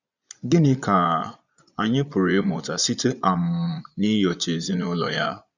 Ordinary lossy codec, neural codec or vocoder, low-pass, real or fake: none; vocoder, 22.05 kHz, 80 mel bands, Vocos; 7.2 kHz; fake